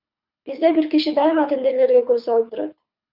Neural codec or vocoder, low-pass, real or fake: codec, 24 kHz, 6 kbps, HILCodec; 5.4 kHz; fake